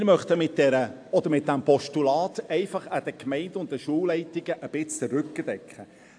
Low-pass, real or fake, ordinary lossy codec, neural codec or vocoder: 9.9 kHz; real; AAC, 64 kbps; none